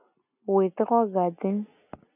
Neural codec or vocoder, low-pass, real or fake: none; 3.6 kHz; real